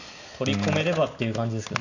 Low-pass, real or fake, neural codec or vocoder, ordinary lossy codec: 7.2 kHz; real; none; none